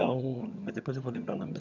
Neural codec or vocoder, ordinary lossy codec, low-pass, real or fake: vocoder, 22.05 kHz, 80 mel bands, HiFi-GAN; none; 7.2 kHz; fake